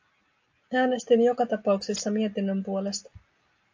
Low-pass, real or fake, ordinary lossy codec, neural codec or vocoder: 7.2 kHz; real; AAC, 48 kbps; none